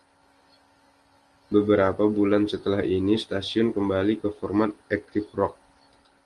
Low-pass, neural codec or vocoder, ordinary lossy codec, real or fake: 10.8 kHz; none; Opus, 24 kbps; real